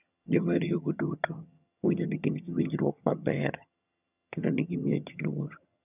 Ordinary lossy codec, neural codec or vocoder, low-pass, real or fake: none; vocoder, 22.05 kHz, 80 mel bands, HiFi-GAN; 3.6 kHz; fake